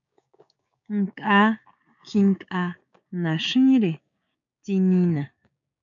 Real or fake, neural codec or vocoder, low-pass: fake; codec, 16 kHz, 6 kbps, DAC; 7.2 kHz